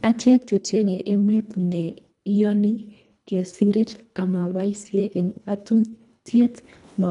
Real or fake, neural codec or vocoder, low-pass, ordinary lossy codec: fake; codec, 24 kHz, 1.5 kbps, HILCodec; 10.8 kHz; none